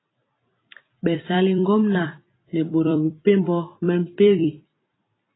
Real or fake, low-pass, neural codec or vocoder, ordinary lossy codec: fake; 7.2 kHz; vocoder, 44.1 kHz, 128 mel bands every 256 samples, BigVGAN v2; AAC, 16 kbps